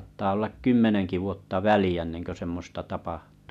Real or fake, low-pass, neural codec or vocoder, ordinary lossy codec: real; 14.4 kHz; none; none